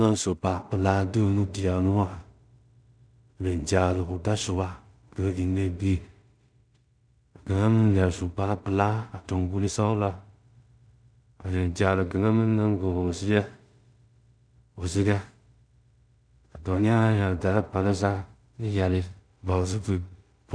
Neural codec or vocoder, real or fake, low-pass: codec, 16 kHz in and 24 kHz out, 0.4 kbps, LongCat-Audio-Codec, two codebook decoder; fake; 9.9 kHz